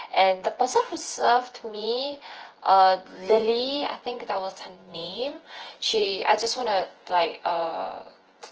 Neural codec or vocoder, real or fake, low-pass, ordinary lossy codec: vocoder, 24 kHz, 100 mel bands, Vocos; fake; 7.2 kHz; Opus, 16 kbps